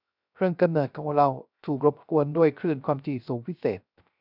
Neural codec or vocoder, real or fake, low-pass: codec, 16 kHz, 0.3 kbps, FocalCodec; fake; 5.4 kHz